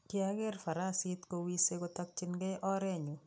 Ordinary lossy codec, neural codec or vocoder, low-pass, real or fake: none; none; none; real